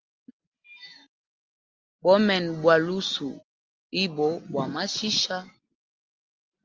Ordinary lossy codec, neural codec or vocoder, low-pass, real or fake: Opus, 32 kbps; none; 7.2 kHz; real